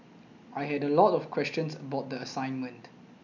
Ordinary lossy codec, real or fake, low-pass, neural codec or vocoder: none; real; 7.2 kHz; none